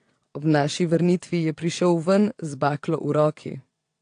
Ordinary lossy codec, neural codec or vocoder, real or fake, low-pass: AAC, 48 kbps; vocoder, 22.05 kHz, 80 mel bands, WaveNeXt; fake; 9.9 kHz